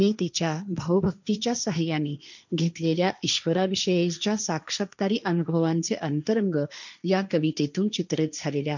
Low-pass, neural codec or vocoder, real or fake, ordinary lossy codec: 7.2 kHz; codec, 16 kHz, 1.1 kbps, Voila-Tokenizer; fake; none